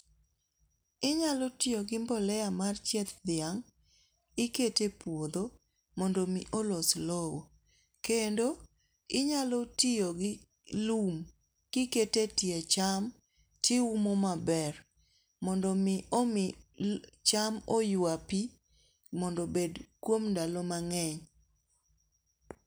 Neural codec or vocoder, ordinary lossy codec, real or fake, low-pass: none; none; real; none